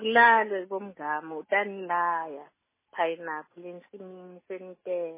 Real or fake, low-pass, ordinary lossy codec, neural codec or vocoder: real; 3.6 kHz; MP3, 16 kbps; none